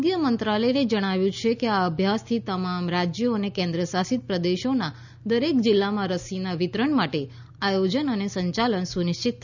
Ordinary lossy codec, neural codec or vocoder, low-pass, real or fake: none; none; 7.2 kHz; real